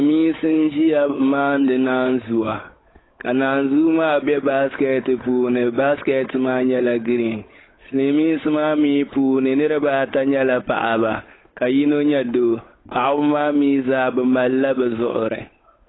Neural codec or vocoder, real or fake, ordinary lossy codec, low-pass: codec, 16 kHz, 8 kbps, FunCodec, trained on Chinese and English, 25 frames a second; fake; AAC, 16 kbps; 7.2 kHz